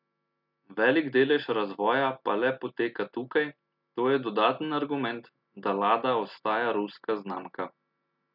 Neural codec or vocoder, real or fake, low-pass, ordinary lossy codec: none; real; 5.4 kHz; none